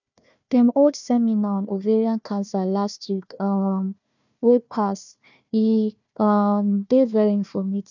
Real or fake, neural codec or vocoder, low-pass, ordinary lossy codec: fake; codec, 16 kHz, 1 kbps, FunCodec, trained on Chinese and English, 50 frames a second; 7.2 kHz; none